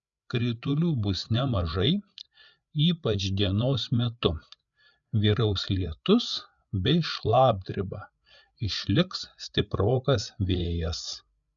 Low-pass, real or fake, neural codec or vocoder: 7.2 kHz; fake; codec, 16 kHz, 8 kbps, FreqCodec, larger model